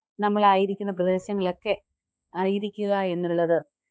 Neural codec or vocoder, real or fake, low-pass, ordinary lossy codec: codec, 16 kHz, 2 kbps, X-Codec, HuBERT features, trained on balanced general audio; fake; none; none